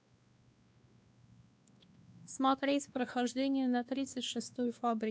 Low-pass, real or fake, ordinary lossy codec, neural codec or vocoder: none; fake; none; codec, 16 kHz, 2 kbps, X-Codec, WavLM features, trained on Multilingual LibriSpeech